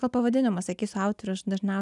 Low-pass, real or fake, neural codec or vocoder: 10.8 kHz; real; none